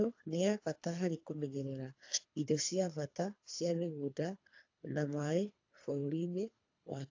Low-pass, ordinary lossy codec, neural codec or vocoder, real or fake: 7.2 kHz; AAC, 48 kbps; codec, 24 kHz, 3 kbps, HILCodec; fake